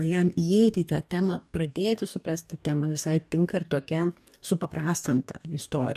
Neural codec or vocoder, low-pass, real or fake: codec, 44.1 kHz, 2.6 kbps, DAC; 14.4 kHz; fake